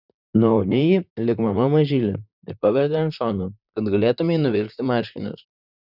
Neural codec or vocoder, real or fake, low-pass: vocoder, 44.1 kHz, 128 mel bands, Pupu-Vocoder; fake; 5.4 kHz